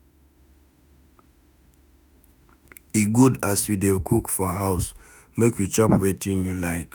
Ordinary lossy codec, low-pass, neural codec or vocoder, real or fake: none; none; autoencoder, 48 kHz, 32 numbers a frame, DAC-VAE, trained on Japanese speech; fake